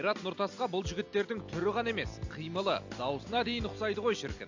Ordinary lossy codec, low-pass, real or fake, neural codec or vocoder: AAC, 48 kbps; 7.2 kHz; real; none